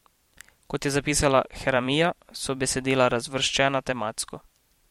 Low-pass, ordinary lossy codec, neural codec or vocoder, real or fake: 19.8 kHz; MP3, 64 kbps; vocoder, 44.1 kHz, 128 mel bands every 512 samples, BigVGAN v2; fake